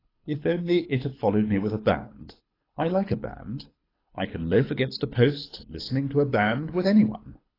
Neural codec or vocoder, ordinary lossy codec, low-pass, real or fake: codec, 24 kHz, 6 kbps, HILCodec; AAC, 24 kbps; 5.4 kHz; fake